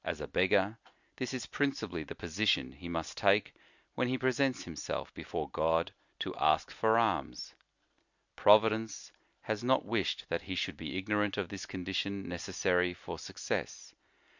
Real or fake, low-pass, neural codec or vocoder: real; 7.2 kHz; none